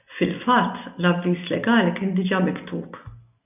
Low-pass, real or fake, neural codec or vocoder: 3.6 kHz; real; none